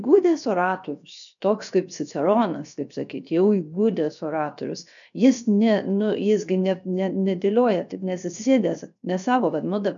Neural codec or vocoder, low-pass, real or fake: codec, 16 kHz, 0.7 kbps, FocalCodec; 7.2 kHz; fake